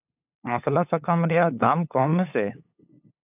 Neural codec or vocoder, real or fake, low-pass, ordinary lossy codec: codec, 16 kHz, 8 kbps, FunCodec, trained on LibriTTS, 25 frames a second; fake; 3.6 kHz; AAC, 32 kbps